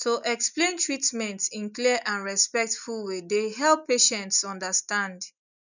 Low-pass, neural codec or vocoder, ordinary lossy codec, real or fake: 7.2 kHz; none; none; real